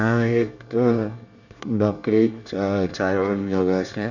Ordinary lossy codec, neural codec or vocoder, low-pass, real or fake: none; codec, 24 kHz, 1 kbps, SNAC; 7.2 kHz; fake